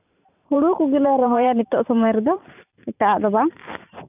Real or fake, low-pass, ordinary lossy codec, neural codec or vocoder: fake; 3.6 kHz; none; vocoder, 44.1 kHz, 128 mel bands every 512 samples, BigVGAN v2